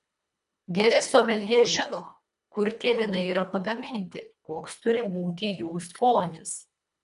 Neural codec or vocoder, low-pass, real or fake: codec, 24 kHz, 1.5 kbps, HILCodec; 10.8 kHz; fake